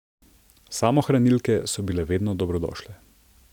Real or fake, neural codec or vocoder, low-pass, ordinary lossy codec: real; none; 19.8 kHz; none